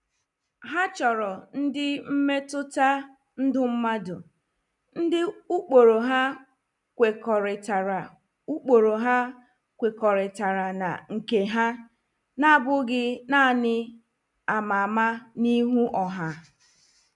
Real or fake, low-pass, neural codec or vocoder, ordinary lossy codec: real; 10.8 kHz; none; none